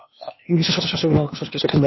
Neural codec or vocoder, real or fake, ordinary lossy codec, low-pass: codec, 16 kHz, 0.8 kbps, ZipCodec; fake; MP3, 24 kbps; 7.2 kHz